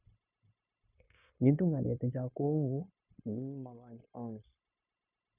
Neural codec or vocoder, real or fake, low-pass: codec, 16 kHz, 0.9 kbps, LongCat-Audio-Codec; fake; 3.6 kHz